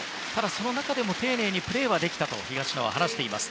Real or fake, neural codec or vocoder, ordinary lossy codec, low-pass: real; none; none; none